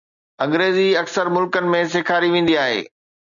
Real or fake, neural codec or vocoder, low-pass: real; none; 7.2 kHz